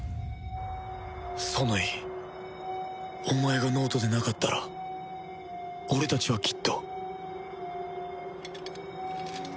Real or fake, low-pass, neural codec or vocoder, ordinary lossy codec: real; none; none; none